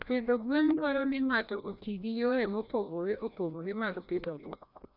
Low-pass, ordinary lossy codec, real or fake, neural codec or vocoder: 5.4 kHz; none; fake; codec, 16 kHz, 1 kbps, FreqCodec, larger model